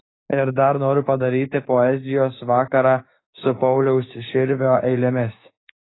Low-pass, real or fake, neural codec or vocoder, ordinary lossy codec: 7.2 kHz; fake; codec, 16 kHz, 6 kbps, DAC; AAC, 16 kbps